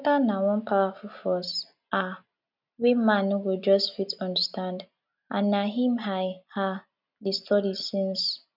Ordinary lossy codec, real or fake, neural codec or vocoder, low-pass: none; real; none; 5.4 kHz